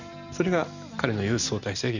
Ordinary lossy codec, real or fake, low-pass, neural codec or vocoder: none; real; 7.2 kHz; none